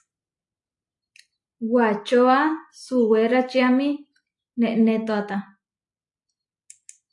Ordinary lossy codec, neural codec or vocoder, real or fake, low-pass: AAC, 64 kbps; none; real; 10.8 kHz